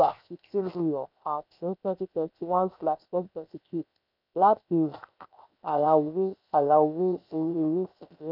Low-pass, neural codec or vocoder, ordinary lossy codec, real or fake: 5.4 kHz; codec, 16 kHz, 0.7 kbps, FocalCodec; none; fake